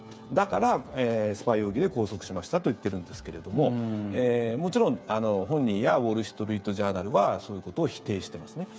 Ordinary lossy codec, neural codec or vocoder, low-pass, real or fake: none; codec, 16 kHz, 8 kbps, FreqCodec, smaller model; none; fake